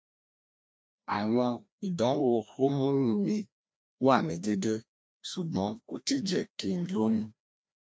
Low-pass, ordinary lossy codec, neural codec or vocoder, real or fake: none; none; codec, 16 kHz, 1 kbps, FreqCodec, larger model; fake